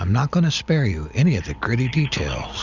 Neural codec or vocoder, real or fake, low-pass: none; real; 7.2 kHz